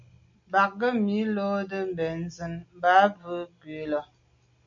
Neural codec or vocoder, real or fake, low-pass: none; real; 7.2 kHz